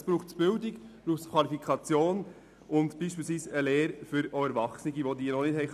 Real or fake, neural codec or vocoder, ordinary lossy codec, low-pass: real; none; none; 14.4 kHz